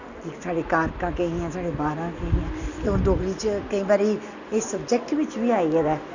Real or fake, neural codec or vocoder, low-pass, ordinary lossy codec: real; none; 7.2 kHz; none